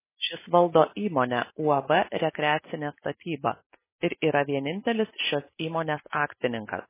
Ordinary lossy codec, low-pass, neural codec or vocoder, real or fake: MP3, 16 kbps; 3.6 kHz; none; real